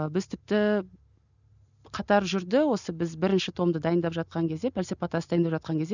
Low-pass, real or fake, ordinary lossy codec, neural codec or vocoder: 7.2 kHz; real; none; none